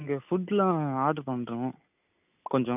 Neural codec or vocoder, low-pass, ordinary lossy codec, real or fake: none; 3.6 kHz; none; real